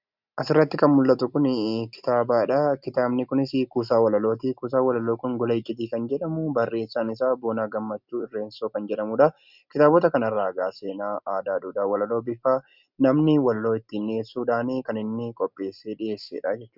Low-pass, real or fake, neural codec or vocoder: 5.4 kHz; real; none